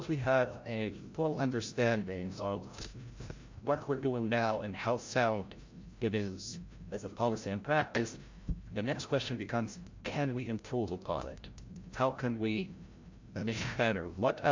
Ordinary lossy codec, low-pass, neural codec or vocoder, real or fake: MP3, 48 kbps; 7.2 kHz; codec, 16 kHz, 0.5 kbps, FreqCodec, larger model; fake